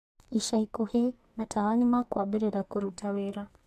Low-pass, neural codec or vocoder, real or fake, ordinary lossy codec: 14.4 kHz; codec, 44.1 kHz, 2.6 kbps, SNAC; fake; MP3, 96 kbps